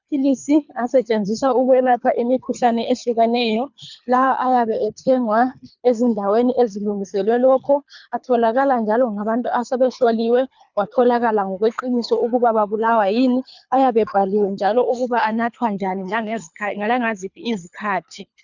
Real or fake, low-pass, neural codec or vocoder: fake; 7.2 kHz; codec, 24 kHz, 3 kbps, HILCodec